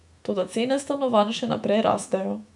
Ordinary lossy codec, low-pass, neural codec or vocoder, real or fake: none; 10.8 kHz; autoencoder, 48 kHz, 128 numbers a frame, DAC-VAE, trained on Japanese speech; fake